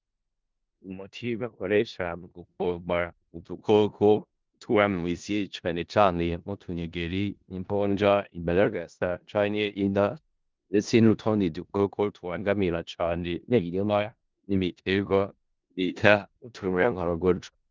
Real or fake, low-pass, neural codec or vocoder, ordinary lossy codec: fake; 7.2 kHz; codec, 16 kHz in and 24 kHz out, 0.4 kbps, LongCat-Audio-Codec, four codebook decoder; Opus, 32 kbps